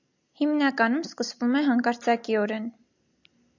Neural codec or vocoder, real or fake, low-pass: none; real; 7.2 kHz